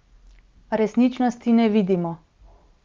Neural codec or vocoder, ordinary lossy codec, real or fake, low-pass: none; Opus, 24 kbps; real; 7.2 kHz